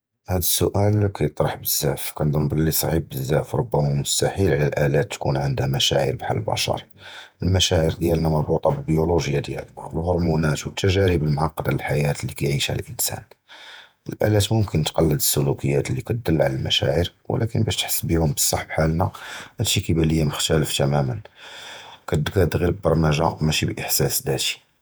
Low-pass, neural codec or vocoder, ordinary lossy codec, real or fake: none; vocoder, 48 kHz, 128 mel bands, Vocos; none; fake